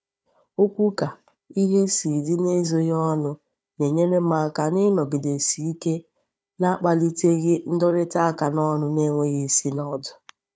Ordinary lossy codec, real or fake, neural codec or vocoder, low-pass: none; fake; codec, 16 kHz, 4 kbps, FunCodec, trained on Chinese and English, 50 frames a second; none